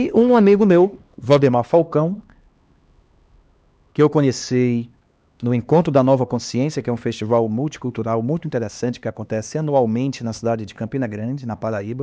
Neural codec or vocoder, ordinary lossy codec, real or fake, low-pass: codec, 16 kHz, 2 kbps, X-Codec, HuBERT features, trained on LibriSpeech; none; fake; none